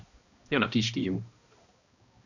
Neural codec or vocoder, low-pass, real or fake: codec, 16 kHz, 1 kbps, X-Codec, HuBERT features, trained on balanced general audio; 7.2 kHz; fake